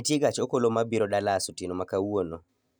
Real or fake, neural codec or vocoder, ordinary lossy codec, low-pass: real; none; none; none